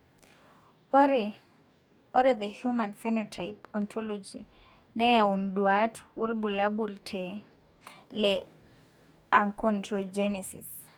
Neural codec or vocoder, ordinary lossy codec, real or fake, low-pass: codec, 44.1 kHz, 2.6 kbps, DAC; none; fake; none